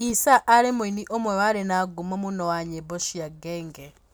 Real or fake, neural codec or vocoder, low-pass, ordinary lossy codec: real; none; none; none